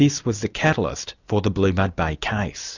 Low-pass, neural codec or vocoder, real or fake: 7.2 kHz; none; real